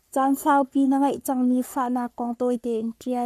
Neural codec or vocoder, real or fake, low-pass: codec, 44.1 kHz, 3.4 kbps, Pupu-Codec; fake; 14.4 kHz